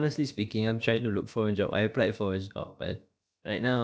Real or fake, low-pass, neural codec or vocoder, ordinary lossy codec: fake; none; codec, 16 kHz, about 1 kbps, DyCAST, with the encoder's durations; none